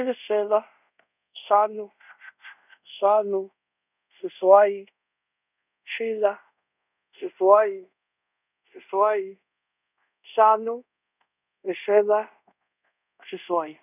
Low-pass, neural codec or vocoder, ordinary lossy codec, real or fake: 3.6 kHz; codec, 24 kHz, 0.5 kbps, DualCodec; none; fake